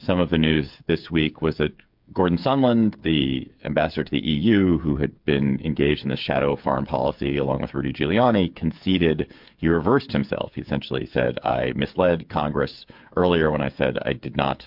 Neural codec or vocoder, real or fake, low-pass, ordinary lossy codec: codec, 16 kHz, 8 kbps, FreqCodec, smaller model; fake; 5.4 kHz; MP3, 48 kbps